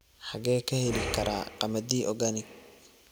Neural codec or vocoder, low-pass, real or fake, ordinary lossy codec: none; none; real; none